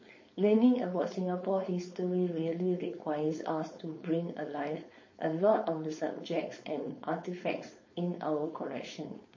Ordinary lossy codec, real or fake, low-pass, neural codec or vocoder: MP3, 32 kbps; fake; 7.2 kHz; codec, 16 kHz, 4.8 kbps, FACodec